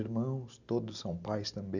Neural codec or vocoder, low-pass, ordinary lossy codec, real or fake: none; 7.2 kHz; none; real